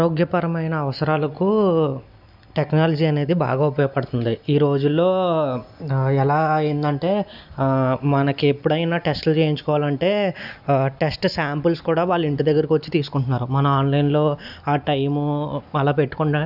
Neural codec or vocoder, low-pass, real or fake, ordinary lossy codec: none; 5.4 kHz; real; none